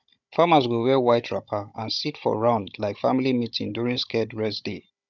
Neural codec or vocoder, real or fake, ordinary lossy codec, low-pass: codec, 16 kHz, 16 kbps, FunCodec, trained on Chinese and English, 50 frames a second; fake; none; 7.2 kHz